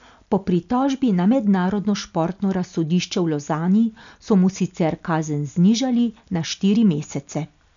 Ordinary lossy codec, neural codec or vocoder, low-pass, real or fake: none; none; 7.2 kHz; real